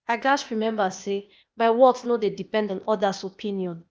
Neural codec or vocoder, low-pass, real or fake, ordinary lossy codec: codec, 16 kHz, 0.8 kbps, ZipCodec; none; fake; none